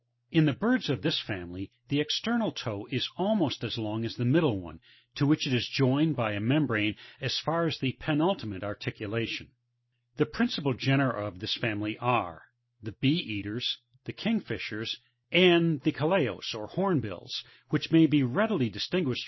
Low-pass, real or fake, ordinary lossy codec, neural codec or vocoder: 7.2 kHz; real; MP3, 24 kbps; none